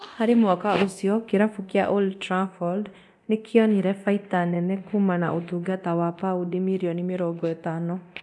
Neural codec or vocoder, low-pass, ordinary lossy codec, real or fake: codec, 24 kHz, 0.9 kbps, DualCodec; none; none; fake